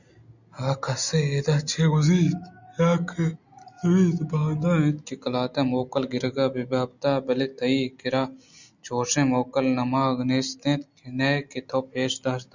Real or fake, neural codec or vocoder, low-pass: real; none; 7.2 kHz